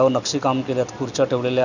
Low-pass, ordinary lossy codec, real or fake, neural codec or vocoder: 7.2 kHz; none; real; none